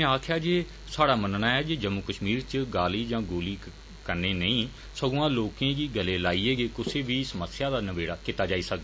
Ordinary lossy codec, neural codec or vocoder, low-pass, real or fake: none; none; none; real